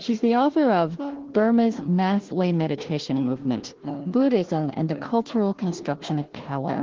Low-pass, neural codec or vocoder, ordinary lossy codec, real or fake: 7.2 kHz; codec, 16 kHz, 1 kbps, FunCodec, trained on Chinese and English, 50 frames a second; Opus, 16 kbps; fake